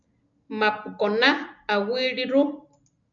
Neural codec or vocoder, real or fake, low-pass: none; real; 7.2 kHz